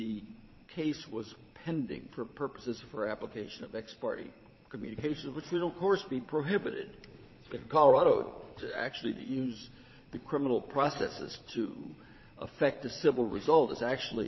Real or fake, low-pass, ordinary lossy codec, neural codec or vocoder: fake; 7.2 kHz; MP3, 24 kbps; vocoder, 22.05 kHz, 80 mel bands, Vocos